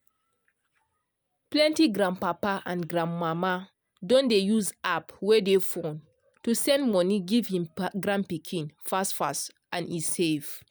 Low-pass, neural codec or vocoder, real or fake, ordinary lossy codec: none; none; real; none